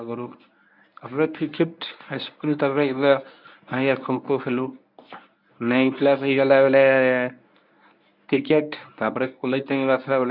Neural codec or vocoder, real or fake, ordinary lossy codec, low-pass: codec, 24 kHz, 0.9 kbps, WavTokenizer, medium speech release version 1; fake; none; 5.4 kHz